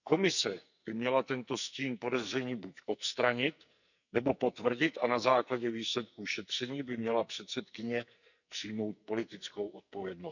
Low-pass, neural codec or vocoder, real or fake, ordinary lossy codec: 7.2 kHz; codec, 44.1 kHz, 2.6 kbps, SNAC; fake; none